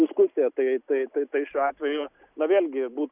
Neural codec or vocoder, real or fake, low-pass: none; real; 3.6 kHz